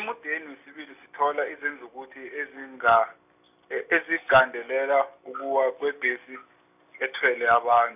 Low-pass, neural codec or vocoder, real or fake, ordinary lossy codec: 3.6 kHz; none; real; none